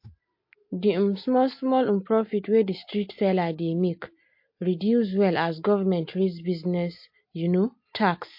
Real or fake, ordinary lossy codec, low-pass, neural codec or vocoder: real; MP3, 32 kbps; 5.4 kHz; none